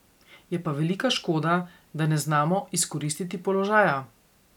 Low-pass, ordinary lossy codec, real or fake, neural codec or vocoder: 19.8 kHz; none; real; none